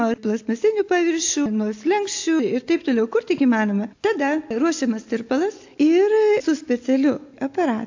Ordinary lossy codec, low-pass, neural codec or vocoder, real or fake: AAC, 48 kbps; 7.2 kHz; none; real